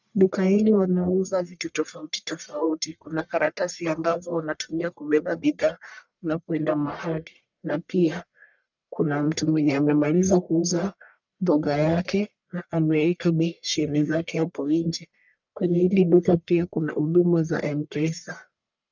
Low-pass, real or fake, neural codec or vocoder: 7.2 kHz; fake; codec, 44.1 kHz, 1.7 kbps, Pupu-Codec